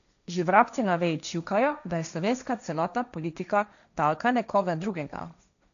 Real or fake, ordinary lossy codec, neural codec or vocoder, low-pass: fake; none; codec, 16 kHz, 1.1 kbps, Voila-Tokenizer; 7.2 kHz